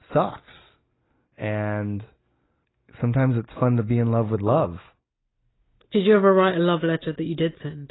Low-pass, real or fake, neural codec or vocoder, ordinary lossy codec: 7.2 kHz; real; none; AAC, 16 kbps